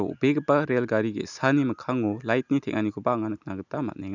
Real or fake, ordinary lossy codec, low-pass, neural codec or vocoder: real; none; 7.2 kHz; none